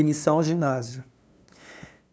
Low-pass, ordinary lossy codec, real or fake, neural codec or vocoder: none; none; fake; codec, 16 kHz, 2 kbps, FunCodec, trained on LibriTTS, 25 frames a second